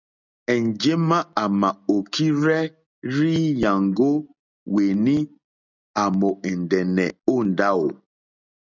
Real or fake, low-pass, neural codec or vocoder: real; 7.2 kHz; none